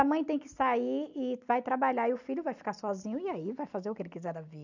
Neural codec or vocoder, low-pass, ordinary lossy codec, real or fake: none; 7.2 kHz; none; real